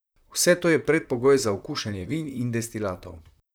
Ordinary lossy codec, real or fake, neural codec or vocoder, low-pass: none; fake; vocoder, 44.1 kHz, 128 mel bands, Pupu-Vocoder; none